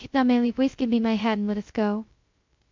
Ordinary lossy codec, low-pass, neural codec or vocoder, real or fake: MP3, 48 kbps; 7.2 kHz; codec, 16 kHz, 0.2 kbps, FocalCodec; fake